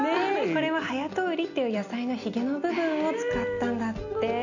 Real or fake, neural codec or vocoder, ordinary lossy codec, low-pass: real; none; none; 7.2 kHz